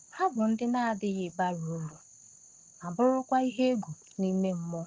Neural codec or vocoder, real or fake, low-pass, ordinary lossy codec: none; real; 7.2 kHz; Opus, 16 kbps